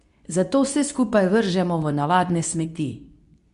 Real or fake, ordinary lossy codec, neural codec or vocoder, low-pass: fake; none; codec, 24 kHz, 0.9 kbps, WavTokenizer, medium speech release version 2; 10.8 kHz